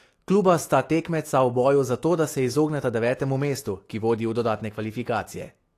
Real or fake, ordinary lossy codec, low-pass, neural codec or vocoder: fake; AAC, 48 kbps; 14.4 kHz; autoencoder, 48 kHz, 128 numbers a frame, DAC-VAE, trained on Japanese speech